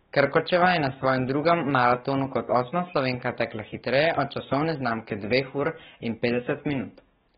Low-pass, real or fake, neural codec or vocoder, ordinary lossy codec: 19.8 kHz; fake; codec, 44.1 kHz, 7.8 kbps, DAC; AAC, 16 kbps